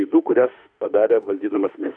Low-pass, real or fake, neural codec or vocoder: 9.9 kHz; fake; autoencoder, 48 kHz, 32 numbers a frame, DAC-VAE, trained on Japanese speech